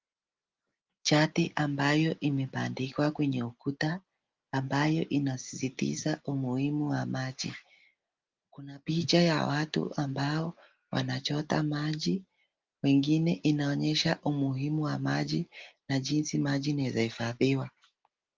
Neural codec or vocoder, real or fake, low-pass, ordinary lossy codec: none; real; 7.2 kHz; Opus, 32 kbps